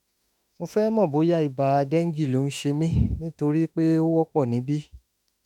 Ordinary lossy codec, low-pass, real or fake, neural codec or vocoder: none; 19.8 kHz; fake; autoencoder, 48 kHz, 32 numbers a frame, DAC-VAE, trained on Japanese speech